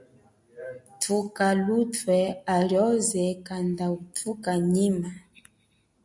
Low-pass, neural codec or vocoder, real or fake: 10.8 kHz; none; real